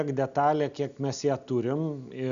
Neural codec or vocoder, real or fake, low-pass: none; real; 7.2 kHz